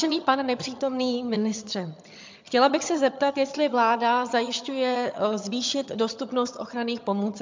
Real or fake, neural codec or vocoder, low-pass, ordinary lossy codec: fake; vocoder, 22.05 kHz, 80 mel bands, HiFi-GAN; 7.2 kHz; MP3, 64 kbps